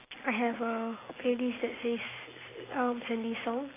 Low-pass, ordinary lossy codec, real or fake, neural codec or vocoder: 3.6 kHz; AAC, 16 kbps; real; none